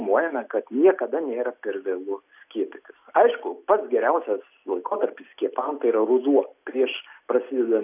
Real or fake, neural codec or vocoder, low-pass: real; none; 3.6 kHz